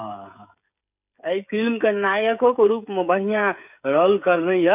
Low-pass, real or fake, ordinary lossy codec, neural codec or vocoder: 3.6 kHz; fake; none; codec, 16 kHz, 16 kbps, FreqCodec, smaller model